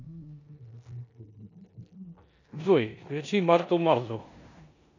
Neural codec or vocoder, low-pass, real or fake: codec, 16 kHz in and 24 kHz out, 0.9 kbps, LongCat-Audio-Codec, four codebook decoder; 7.2 kHz; fake